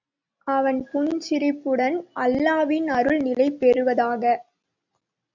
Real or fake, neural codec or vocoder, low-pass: real; none; 7.2 kHz